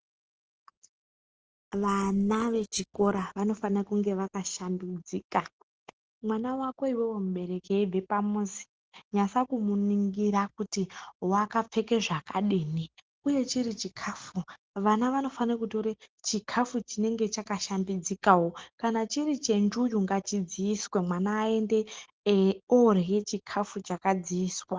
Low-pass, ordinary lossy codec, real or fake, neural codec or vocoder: 7.2 kHz; Opus, 16 kbps; real; none